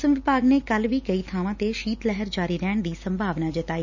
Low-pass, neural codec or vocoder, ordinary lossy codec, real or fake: 7.2 kHz; none; none; real